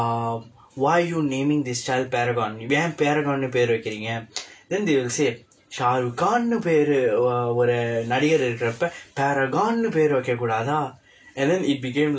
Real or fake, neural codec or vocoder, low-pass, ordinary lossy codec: real; none; none; none